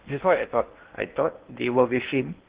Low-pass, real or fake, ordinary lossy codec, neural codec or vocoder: 3.6 kHz; fake; Opus, 16 kbps; codec, 16 kHz in and 24 kHz out, 0.8 kbps, FocalCodec, streaming, 65536 codes